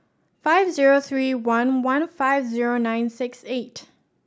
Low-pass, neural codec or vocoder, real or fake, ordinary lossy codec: none; none; real; none